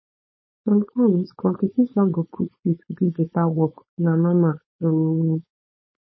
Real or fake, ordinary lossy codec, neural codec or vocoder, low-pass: fake; MP3, 24 kbps; codec, 16 kHz, 4.8 kbps, FACodec; 7.2 kHz